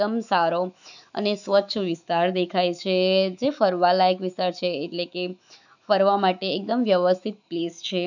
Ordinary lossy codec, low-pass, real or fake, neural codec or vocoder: none; 7.2 kHz; fake; autoencoder, 48 kHz, 128 numbers a frame, DAC-VAE, trained on Japanese speech